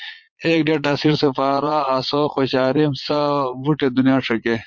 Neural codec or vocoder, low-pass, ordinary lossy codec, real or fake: vocoder, 22.05 kHz, 80 mel bands, Vocos; 7.2 kHz; MP3, 48 kbps; fake